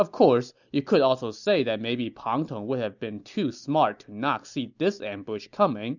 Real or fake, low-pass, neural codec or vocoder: real; 7.2 kHz; none